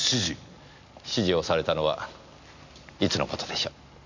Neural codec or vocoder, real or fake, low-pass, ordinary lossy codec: none; real; 7.2 kHz; none